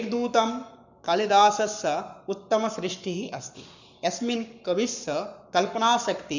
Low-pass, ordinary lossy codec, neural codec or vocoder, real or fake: 7.2 kHz; none; codec, 44.1 kHz, 7.8 kbps, Pupu-Codec; fake